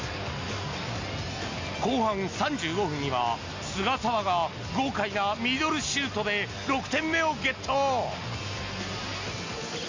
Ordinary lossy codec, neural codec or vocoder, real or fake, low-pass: MP3, 64 kbps; none; real; 7.2 kHz